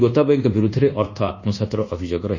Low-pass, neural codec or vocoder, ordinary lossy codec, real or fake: 7.2 kHz; codec, 24 kHz, 1.2 kbps, DualCodec; none; fake